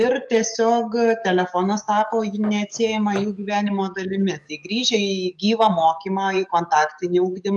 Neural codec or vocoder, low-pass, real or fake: codec, 44.1 kHz, 7.8 kbps, DAC; 10.8 kHz; fake